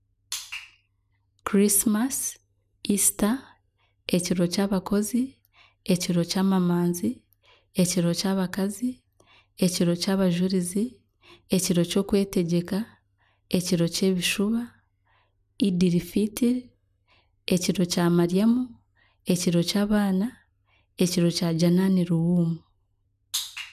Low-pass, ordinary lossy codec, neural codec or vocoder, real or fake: 14.4 kHz; none; none; real